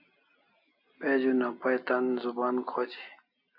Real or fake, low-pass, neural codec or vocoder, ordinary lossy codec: real; 5.4 kHz; none; AAC, 48 kbps